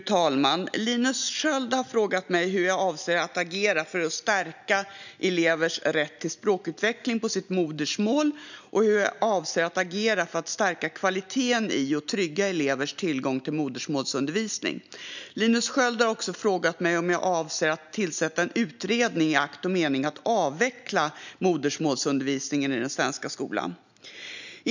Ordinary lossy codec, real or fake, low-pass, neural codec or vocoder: none; real; 7.2 kHz; none